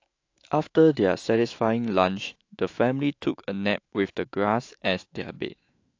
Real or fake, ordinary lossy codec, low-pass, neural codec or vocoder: fake; AAC, 48 kbps; 7.2 kHz; autoencoder, 48 kHz, 128 numbers a frame, DAC-VAE, trained on Japanese speech